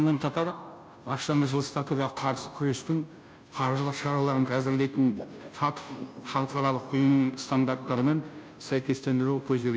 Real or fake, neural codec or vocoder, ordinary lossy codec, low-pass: fake; codec, 16 kHz, 0.5 kbps, FunCodec, trained on Chinese and English, 25 frames a second; none; none